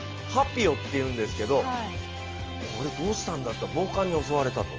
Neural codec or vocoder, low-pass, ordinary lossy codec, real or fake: none; 7.2 kHz; Opus, 24 kbps; real